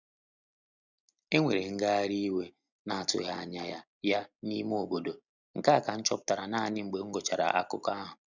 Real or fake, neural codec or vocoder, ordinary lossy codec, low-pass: real; none; none; 7.2 kHz